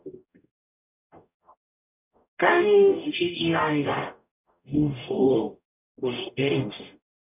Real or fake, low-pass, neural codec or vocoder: fake; 3.6 kHz; codec, 44.1 kHz, 0.9 kbps, DAC